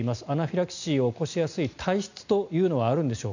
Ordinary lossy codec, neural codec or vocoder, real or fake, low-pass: none; none; real; 7.2 kHz